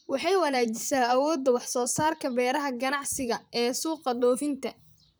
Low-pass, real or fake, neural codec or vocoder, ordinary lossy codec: none; fake; vocoder, 44.1 kHz, 128 mel bands, Pupu-Vocoder; none